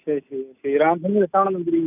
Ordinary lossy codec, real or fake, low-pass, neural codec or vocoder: none; real; 3.6 kHz; none